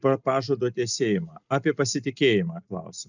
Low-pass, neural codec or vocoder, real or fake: 7.2 kHz; none; real